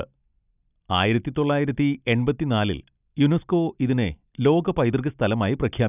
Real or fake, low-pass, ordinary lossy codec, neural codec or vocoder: real; 3.6 kHz; none; none